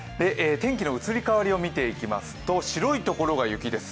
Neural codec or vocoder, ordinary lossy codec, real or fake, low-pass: none; none; real; none